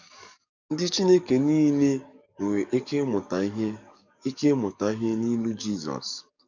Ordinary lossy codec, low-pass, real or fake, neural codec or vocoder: none; 7.2 kHz; fake; codec, 44.1 kHz, 7.8 kbps, DAC